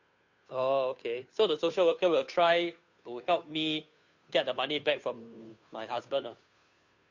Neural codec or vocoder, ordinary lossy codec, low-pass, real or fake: codec, 16 kHz, 2 kbps, FunCodec, trained on Chinese and English, 25 frames a second; MP3, 48 kbps; 7.2 kHz; fake